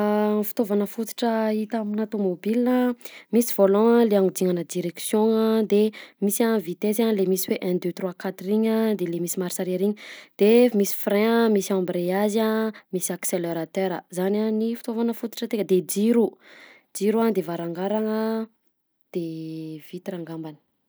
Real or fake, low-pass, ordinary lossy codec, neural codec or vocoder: real; none; none; none